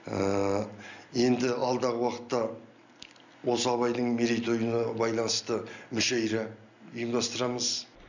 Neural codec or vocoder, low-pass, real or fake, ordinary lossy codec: none; 7.2 kHz; real; none